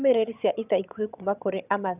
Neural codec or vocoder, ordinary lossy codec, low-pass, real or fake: vocoder, 22.05 kHz, 80 mel bands, HiFi-GAN; none; 3.6 kHz; fake